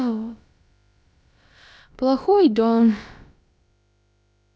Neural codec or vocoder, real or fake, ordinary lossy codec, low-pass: codec, 16 kHz, about 1 kbps, DyCAST, with the encoder's durations; fake; none; none